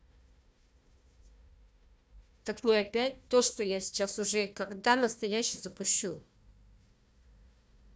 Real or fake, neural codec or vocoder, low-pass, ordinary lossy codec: fake; codec, 16 kHz, 1 kbps, FunCodec, trained on Chinese and English, 50 frames a second; none; none